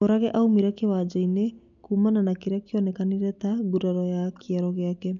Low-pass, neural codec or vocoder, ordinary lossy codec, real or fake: 7.2 kHz; none; none; real